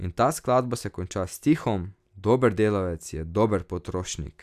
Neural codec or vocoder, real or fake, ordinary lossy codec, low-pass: none; real; none; 14.4 kHz